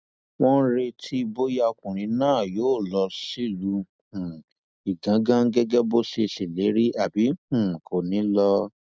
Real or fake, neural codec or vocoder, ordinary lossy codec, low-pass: real; none; none; none